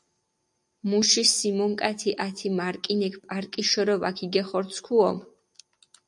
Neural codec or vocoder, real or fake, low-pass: none; real; 10.8 kHz